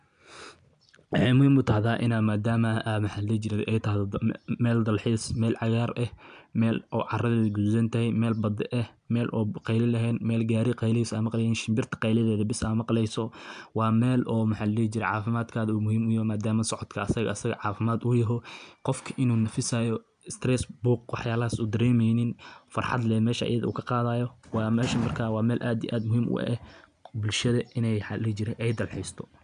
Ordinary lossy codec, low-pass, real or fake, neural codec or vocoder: none; 9.9 kHz; real; none